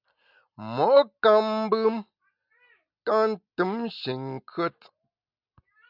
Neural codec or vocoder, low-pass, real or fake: none; 5.4 kHz; real